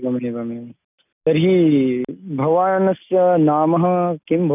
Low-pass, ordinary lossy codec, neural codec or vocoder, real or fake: 3.6 kHz; none; none; real